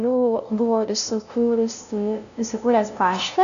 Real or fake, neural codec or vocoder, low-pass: fake; codec, 16 kHz, 0.5 kbps, FunCodec, trained on LibriTTS, 25 frames a second; 7.2 kHz